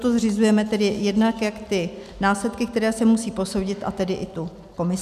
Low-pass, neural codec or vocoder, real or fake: 14.4 kHz; none; real